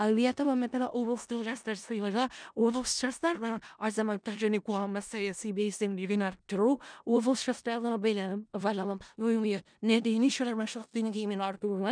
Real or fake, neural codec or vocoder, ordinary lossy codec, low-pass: fake; codec, 16 kHz in and 24 kHz out, 0.4 kbps, LongCat-Audio-Codec, four codebook decoder; none; 9.9 kHz